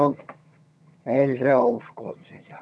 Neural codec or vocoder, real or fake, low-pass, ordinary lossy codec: vocoder, 22.05 kHz, 80 mel bands, HiFi-GAN; fake; none; none